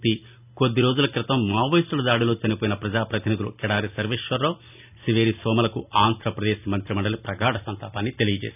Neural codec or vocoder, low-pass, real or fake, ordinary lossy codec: none; 3.6 kHz; real; none